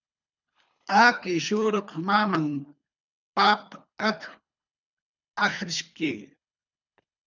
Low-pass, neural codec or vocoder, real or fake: 7.2 kHz; codec, 24 kHz, 3 kbps, HILCodec; fake